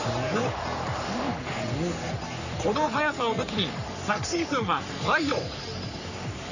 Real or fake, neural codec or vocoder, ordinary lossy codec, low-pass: fake; codec, 44.1 kHz, 3.4 kbps, Pupu-Codec; none; 7.2 kHz